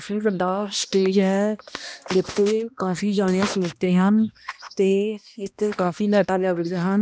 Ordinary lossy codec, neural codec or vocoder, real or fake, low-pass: none; codec, 16 kHz, 1 kbps, X-Codec, HuBERT features, trained on balanced general audio; fake; none